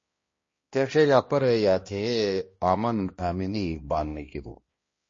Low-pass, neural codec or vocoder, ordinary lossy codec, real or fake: 7.2 kHz; codec, 16 kHz, 1 kbps, X-Codec, HuBERT features, trained on balanced general audio; MP3, 32 kbps; fake